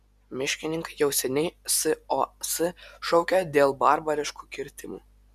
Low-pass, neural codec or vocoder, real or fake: 14.4 kHz; none; real